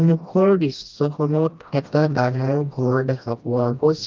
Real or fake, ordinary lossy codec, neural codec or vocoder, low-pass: fake; Opus, 24 kbps; codec, 16 kHz, 1 kbps, FreqCodec, smaller model; 7.2 kHz